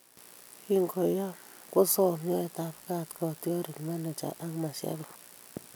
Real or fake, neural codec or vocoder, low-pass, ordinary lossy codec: real; none; none; none